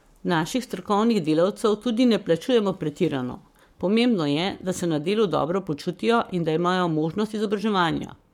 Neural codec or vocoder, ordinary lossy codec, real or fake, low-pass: codec, 44.1 kHz, 7.8 kbps, Pupu-Codec; MP3, 96 kbps; fake; 19.8 kHz